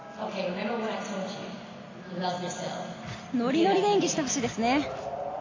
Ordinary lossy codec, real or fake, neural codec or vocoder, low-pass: AAC, 32 kbps; real; none; 7.2 kHz